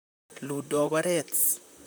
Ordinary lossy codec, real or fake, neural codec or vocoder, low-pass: none; fake; vocoder, 44.1 kHz, 128 mel bands, Pupu-Vocoder; none